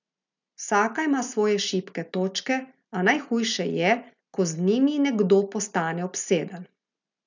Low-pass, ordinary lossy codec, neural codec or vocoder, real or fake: 7.2 kHz; none; none; real